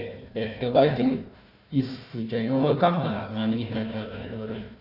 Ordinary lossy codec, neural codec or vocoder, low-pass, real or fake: none; codec, 16 kHz, 1 kbps, FunCodec, trained on Chinese and English, 50 frames a second; 5.4 kHz; fake